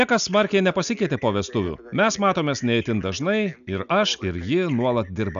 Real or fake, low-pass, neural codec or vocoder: real; 7.2 kHz; none